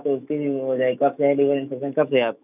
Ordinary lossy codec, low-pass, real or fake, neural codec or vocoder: none; 3.6 kHz; fake; autoencoder, 48 kHz, 128 numbers a frame, DAC-VAE, trained on Japanese speech